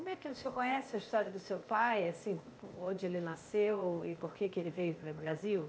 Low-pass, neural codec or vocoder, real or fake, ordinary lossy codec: none; codec, 16 kHz, 0.8 kbps, ZipCodec; fake; none